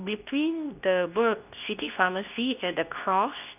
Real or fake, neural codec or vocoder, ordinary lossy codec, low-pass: fake; codec, 24 kHz, 0.9 kbps, WavTokenizer, medium speech release version 2; none; 3.6 kHz